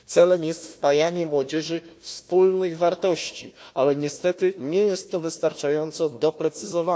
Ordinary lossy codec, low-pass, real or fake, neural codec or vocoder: none; none; fake; codec, 16 kHz, 1 kbps, FunCodec, trained on Chinese and English, 50 frames a second